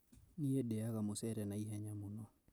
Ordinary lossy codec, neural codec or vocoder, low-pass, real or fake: none; none; none; real